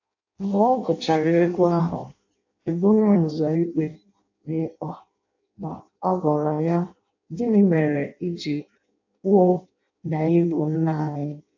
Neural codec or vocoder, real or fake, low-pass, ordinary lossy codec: codec, 16 kHz in and 24 kHz out, 0.6 kbps, FireRedTTS-2 codec; fake; 7.2 kHz; AAC, 48 kbps